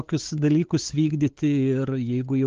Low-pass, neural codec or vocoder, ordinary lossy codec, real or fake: 7.2 kHz; codec, 16 kHz, 8 kbps, FunCodec, trained on LibriTTS, 25 frames a second; Opus, 16 kbps; fake